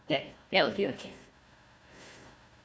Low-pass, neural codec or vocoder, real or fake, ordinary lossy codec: none; codec, 16 kHz, 1 kbps, FunCodec, trained on Chinese and English, 50 frames a second; fake; none